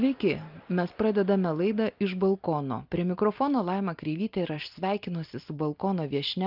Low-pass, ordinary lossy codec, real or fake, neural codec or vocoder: 5.4 kHz; Opus, 24 kbps; real; none